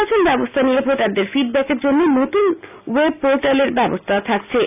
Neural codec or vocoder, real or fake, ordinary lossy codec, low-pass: none; real; none; 3.6 kHz